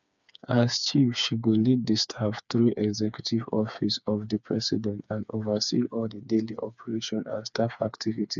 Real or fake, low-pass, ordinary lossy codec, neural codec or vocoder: fake; 7.2 kHz; none; codec, 16 kHz, 4 kbps, FreqCodec, smaller model